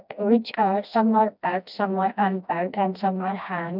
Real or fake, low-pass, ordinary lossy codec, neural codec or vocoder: fake; 5.4 kHz; none; codec, 16 kHz, 1 kbps, FreqCodec, smaller model